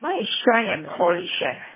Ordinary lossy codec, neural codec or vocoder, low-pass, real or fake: MP3, 16 kbps; codec, 24 kHz, 1.5 kbps, HILCodec; 3.6 kHz; fake